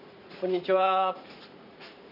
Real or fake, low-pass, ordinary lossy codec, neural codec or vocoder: fake; 5.4 kHz; none; vocoder, 44.1 kHz, 80 mel bands, Vocos